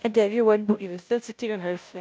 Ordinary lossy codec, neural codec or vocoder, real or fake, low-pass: none; codec, 16 kHz, 0.5 kbps, FunCodec, trained on Chinese and English, 25 frames a second; fake; none